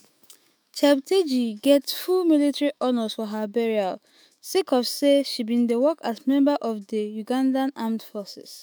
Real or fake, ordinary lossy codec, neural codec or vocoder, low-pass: fake; none; autoencoder, 48 kHz, 128 numbers a frame, DAC-VAE, trained on Japanese speech; none